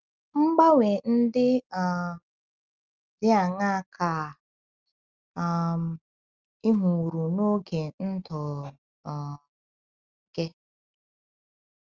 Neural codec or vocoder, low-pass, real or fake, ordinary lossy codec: none; 7.2 kHz; real; Opus, 32 kbps